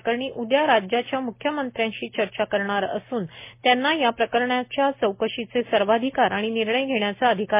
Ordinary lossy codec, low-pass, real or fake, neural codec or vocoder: MP3, 24 kbps; 3.6 kHz; real; none